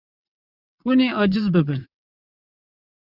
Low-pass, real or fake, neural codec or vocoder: 5.4 kHz; fake; vocoder, 22.05 kHz, 80 mel bands, WaveNeXt